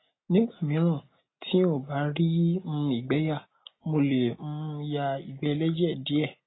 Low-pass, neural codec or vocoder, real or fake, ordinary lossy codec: 7.2 kHz; none; real; AAC, 16 kbps